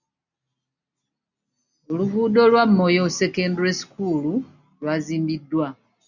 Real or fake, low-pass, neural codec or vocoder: real; 7.2 kHz; none